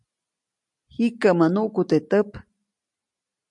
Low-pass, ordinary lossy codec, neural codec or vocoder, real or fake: 10.8 kHz; MP3, 64 kbps; none; real